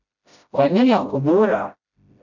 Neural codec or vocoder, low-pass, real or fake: codec, 16 kHz, 0.5 kbps, FreqCodec, smaller model; 7.2 kHz; fake